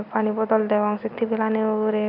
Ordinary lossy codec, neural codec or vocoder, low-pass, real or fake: none; none; 5.4 kHz; real